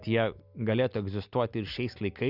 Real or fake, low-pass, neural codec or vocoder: real; 5.4 kHz; none